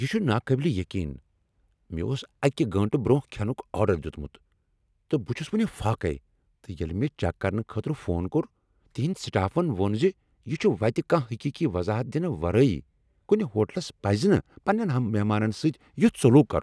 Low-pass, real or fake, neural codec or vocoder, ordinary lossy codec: none; real; none; none